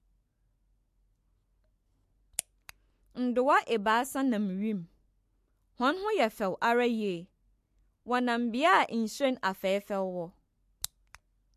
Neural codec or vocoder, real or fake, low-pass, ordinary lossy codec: none; real; 14.4 kHz; MP3, 64 kbps